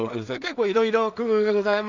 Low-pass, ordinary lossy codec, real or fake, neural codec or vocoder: 7.2 kHz; none; fake; codec, 16 kHz in and 24 kHz out, 0.4 kbps, LongCat-Audio-Codec, two codebook decoder